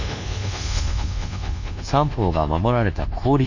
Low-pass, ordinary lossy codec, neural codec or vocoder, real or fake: 7.2 kHz; none; codec, 24 kHz, 1.2 kbps, DualCodec; fake